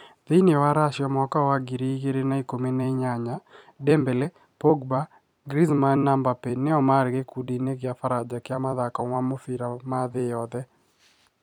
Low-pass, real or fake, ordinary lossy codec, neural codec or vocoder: 19.8 kHz; fake; none; vocoder, 44.1 kHz, 128 mel bands every 256 samples, BigVGAN v2